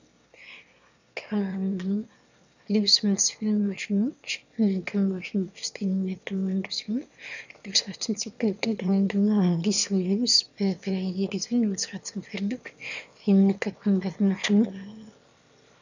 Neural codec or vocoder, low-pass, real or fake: autoencoder, 22.05 kHz, a latent of 192 numbers a frame, VITS, trained on one speaker; 7.2 kHz; fake